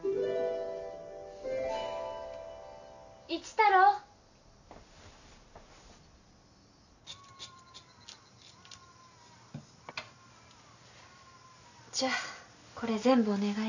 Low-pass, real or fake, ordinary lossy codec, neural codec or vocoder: 7.2 kHz; real; none; none